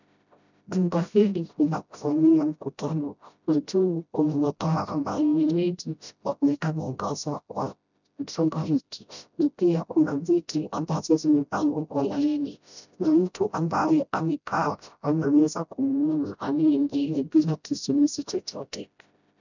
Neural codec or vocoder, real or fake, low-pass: codec, 16 kHz, 0.5 kbps, FreqCodec, smaller model; fake; 7.2 kHz